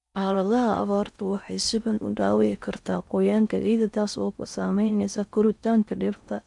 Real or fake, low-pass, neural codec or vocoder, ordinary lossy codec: fake; 10.8 kHz; codec, 16 kHz in and 24 kHz out, 0.6 kbps, FocalCodec, streaming, 4096 codes; none